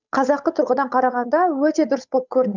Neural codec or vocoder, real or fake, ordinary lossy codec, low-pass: codec, 16 kHz, 8 kbps, FunCodec, trained on Chinese and English, 25 frames a second; fake; none; 7.2 kHz